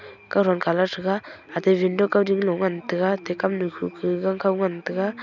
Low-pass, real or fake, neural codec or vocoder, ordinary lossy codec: 7.2 kHz; real; none; none